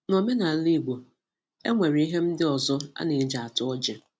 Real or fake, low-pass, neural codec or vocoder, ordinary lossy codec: real; none; none; none